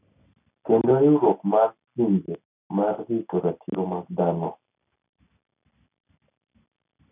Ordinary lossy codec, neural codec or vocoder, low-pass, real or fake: none; none; 3.6 kHz; real